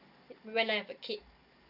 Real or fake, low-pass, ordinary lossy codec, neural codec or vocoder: real; 5.4 kHz; none; none